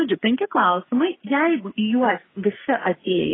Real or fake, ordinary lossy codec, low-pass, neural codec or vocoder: fake; AAC, 16 kbps; 7.2 kHz; codec, 44.1 kHz, 2.6 kbps, SNAC